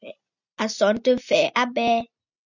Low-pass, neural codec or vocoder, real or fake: 7.2 kHz; none; real